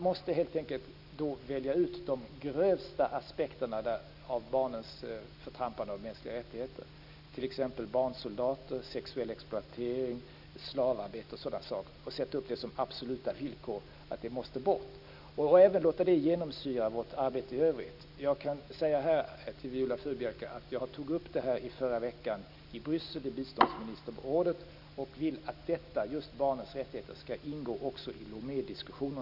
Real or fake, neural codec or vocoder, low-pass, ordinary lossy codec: real; none; 5.4 kHz; none